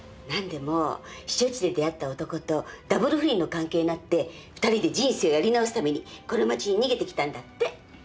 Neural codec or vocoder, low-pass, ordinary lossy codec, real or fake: none; none; none; real